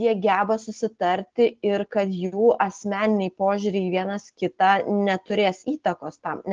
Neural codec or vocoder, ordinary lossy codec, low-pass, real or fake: none; Opus, 16 kbps; 7.2 kHz; real